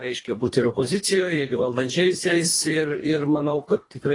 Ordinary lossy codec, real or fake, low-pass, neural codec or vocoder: AAC, 32 kbps; fake; 10.8 kHz; codec, 24 kHz, 1.5 kbps, HILCodec